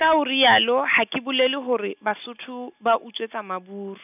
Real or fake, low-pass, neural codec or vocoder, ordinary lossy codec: real; 3.6 kHz; none; none